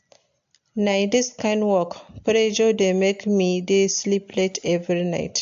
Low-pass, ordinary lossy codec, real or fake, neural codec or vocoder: 7.2 kHz; AAC, 64 kbps; real; none